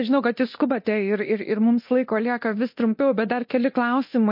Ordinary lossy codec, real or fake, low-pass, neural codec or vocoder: MP3, 32 kbps; fake; 5.4 kHz; codec, 24 kHz, 0.9 kbps, DualCodec